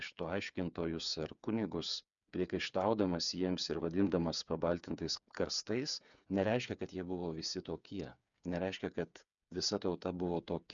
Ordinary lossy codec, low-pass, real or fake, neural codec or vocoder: MP3, 96 kbps; 7.2 kHz; fake; codec, 16 kHz, 8 kbps, FreqCodec, smaller model